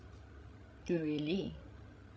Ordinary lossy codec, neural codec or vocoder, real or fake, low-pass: none; codec, 16 kHz, 16 kbps, FreqCodec, larger model; fake; none